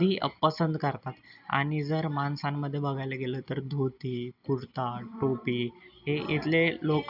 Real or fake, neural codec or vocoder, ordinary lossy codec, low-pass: real; none; none; 5.4 kHz